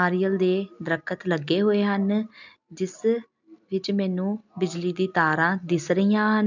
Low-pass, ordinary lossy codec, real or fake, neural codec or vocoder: 7.2 kHz; none; real; none